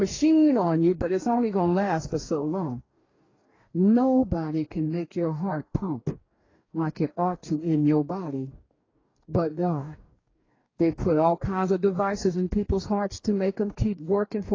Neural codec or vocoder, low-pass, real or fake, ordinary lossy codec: codec, 44.1 kHz, 2.6 kbps, DAC; 7.2 kHz; fake; AAC, 32 kbps